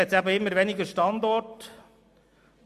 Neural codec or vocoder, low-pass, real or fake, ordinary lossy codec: none; 14.4 kHz; real; MP3, 64 kbps